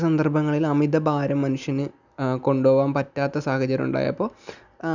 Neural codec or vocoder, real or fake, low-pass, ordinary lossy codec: none; real; 7.2 kHz; none